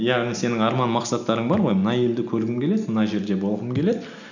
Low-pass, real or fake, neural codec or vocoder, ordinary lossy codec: 7.2 kHz; real; none; none